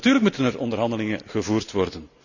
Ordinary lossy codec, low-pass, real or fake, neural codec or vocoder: none; 7.2 kHz; real; none